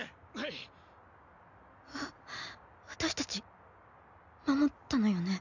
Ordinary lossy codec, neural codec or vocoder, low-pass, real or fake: none; none; 7.2 kHz; real